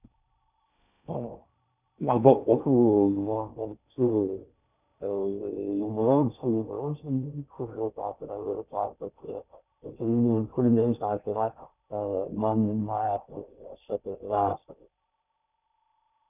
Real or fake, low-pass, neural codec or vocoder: fake; 3.6 kHz; codec, 16 kHz in and 24 kHz out, 0.6 kbps, FocalCodec, streaming, 4096 codes